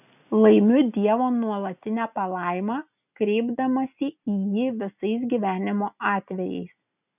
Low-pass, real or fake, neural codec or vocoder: 3.6 kHz; real; none